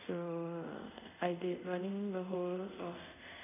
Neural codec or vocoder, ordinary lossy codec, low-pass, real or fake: codec, 24 kHz, 0.5 kbps, DualCodec; none; 3.6 kHz; fake